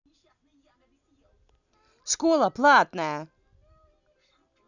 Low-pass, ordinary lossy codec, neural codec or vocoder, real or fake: 7.2 kHz; none; none; real